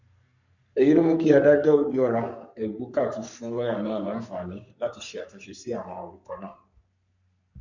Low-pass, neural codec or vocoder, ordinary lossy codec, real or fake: 7.2 kHz; codec, 44.1 kHz, 3.4 kbps, Pupu-Codec; none; fake